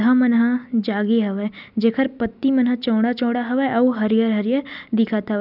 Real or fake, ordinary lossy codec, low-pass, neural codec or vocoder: real; none; 5.4 kHz; none